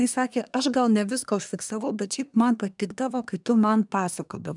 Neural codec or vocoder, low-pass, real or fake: codec, 24 kHz, 1 kbps, SNAC; 10.8 kHz; fake